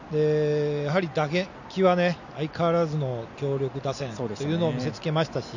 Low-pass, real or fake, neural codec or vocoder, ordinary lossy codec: 7.2 kHz; real; none; none